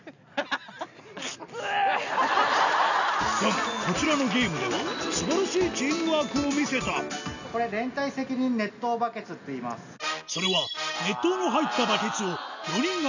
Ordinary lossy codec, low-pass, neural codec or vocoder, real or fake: none; 7.2 kHz; none; real